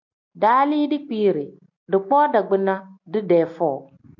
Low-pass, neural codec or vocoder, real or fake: 7.2 kHz; none; real